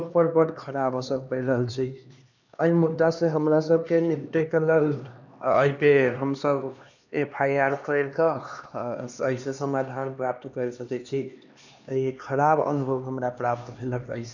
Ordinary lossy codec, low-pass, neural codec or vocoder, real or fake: none; 7.2 kHz; codec, 16 kHz, 2 kbps, X-Codec, HuBERT features, trained on LibriSpeech; fake